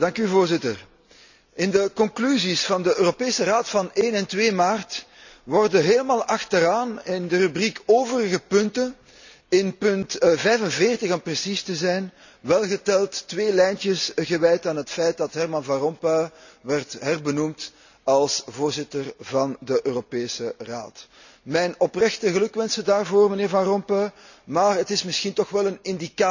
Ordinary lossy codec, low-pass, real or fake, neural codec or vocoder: none; 7.2 kHz; real; none